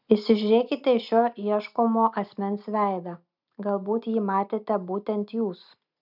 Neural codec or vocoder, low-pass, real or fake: none; 5.4 kHz; real